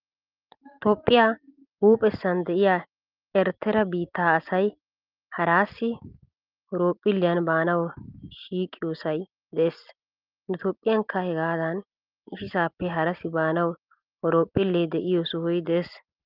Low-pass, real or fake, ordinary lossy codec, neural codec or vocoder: 5.4 kHz; real; Opus, 32 kbps; none